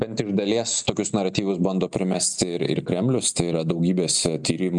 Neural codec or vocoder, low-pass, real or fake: none; 10.8 kHz; real